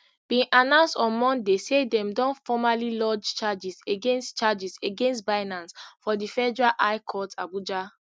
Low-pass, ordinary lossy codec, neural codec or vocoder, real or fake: none; none; none; real